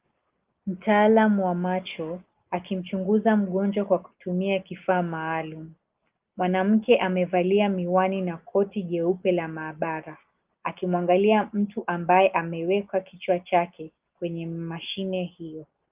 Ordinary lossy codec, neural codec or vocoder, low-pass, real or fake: Opus, 24 kbps; none; 3.6 kHz; real